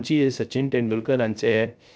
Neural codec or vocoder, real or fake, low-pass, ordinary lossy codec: codec, 16 kHz, 0.3 kbps, FocalCodec; fake; none; none